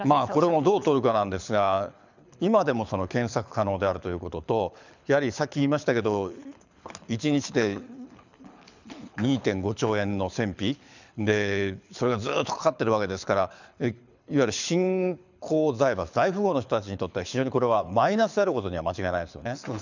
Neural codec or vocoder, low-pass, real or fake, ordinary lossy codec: codec, 24 kHz, 6 kbps, HILCodec; 7.2 kHz; fake; none